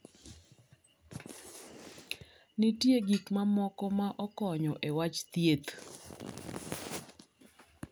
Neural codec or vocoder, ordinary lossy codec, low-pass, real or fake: none; none; none; real